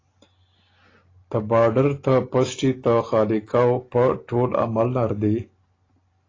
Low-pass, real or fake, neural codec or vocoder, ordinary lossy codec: 7.2 kHz; real; none; AAC, 32 kbps